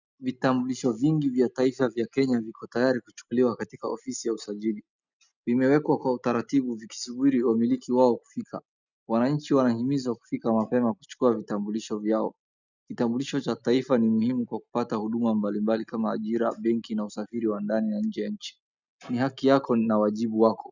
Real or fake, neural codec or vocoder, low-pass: real; none; 7.2 kHz